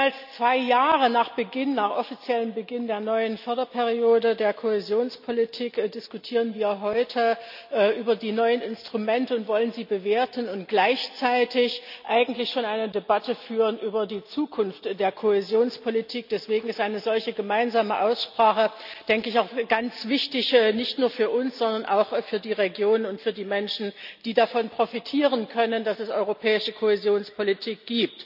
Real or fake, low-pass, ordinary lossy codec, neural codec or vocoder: real; 5.4 kHz; none; none